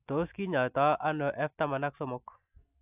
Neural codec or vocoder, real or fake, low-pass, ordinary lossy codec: none; real; 3.6 kHz; AAC, 32 kbps